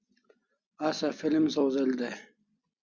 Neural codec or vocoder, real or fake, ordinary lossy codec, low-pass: none; real; Opus, 64 kbps; 7.2 kHz